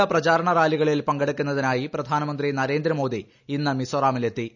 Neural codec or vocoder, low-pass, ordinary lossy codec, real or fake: none; 7.2 kHz; none; real